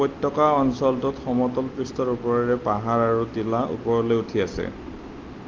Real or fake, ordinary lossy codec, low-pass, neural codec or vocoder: real; Opus, 24 kbps; 7.2 kHz; none